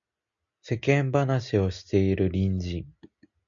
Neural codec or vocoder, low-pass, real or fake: none; 7.2 kHz; real